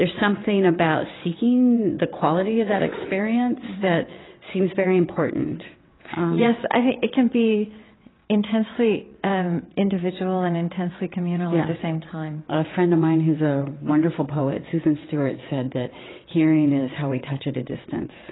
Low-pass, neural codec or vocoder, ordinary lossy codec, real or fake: 7.2 kHz; vocoder, 22.05 kHz, 80 mel bands, WaveNeXt; AAC, 16 kbps; fake